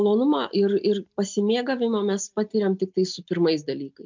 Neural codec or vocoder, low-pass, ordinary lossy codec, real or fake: none; 7.2 kHz; MP3, 64 kbps; real